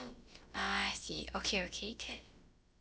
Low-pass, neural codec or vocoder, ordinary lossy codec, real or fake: none; codec, 16 kHz, about 1 kbps, DyCAST, with the encoder's durations; none; fake